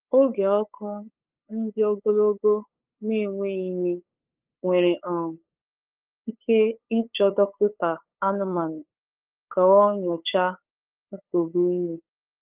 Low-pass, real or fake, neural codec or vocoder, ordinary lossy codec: 3.6 kHz; fake; codec, 16 kHz, 8 kbps, FunCodec, trained on LibriTTS, 25 frames a second; Opus, 24 kbps